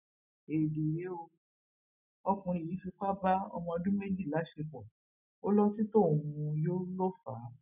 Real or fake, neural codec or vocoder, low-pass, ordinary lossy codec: real; none; 3.6 kHz; none